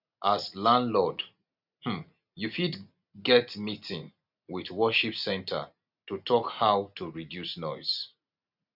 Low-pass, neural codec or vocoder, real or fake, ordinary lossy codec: 5.4 kHz; none; real; none